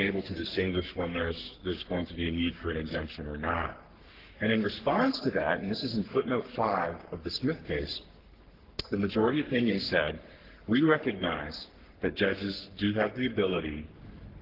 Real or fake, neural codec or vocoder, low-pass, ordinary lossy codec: fake; codec, 44.1 kHz, 3.4 kbps, Pupu-Codec; 5.4 kHz; Opus, 16 kbps